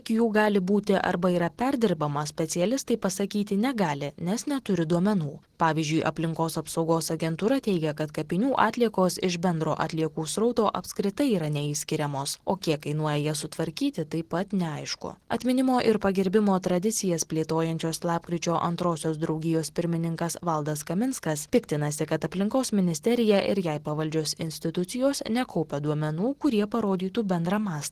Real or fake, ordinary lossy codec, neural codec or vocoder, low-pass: real; Opus, 16 kbps; none; 14.4 kHz